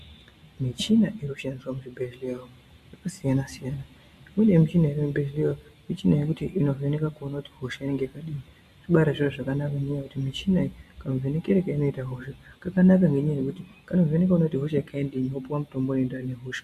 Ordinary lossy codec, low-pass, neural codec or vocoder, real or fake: MP3, 64 kbps; 14.4 kHz; none; real